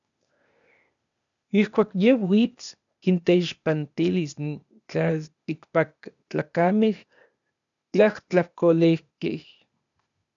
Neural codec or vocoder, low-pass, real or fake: codec, 16 kHz, 0.8 kbps, ZipCodec; 7.2 kHz; fake